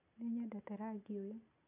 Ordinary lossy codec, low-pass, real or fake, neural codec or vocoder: none; 3.6 kHz; real; none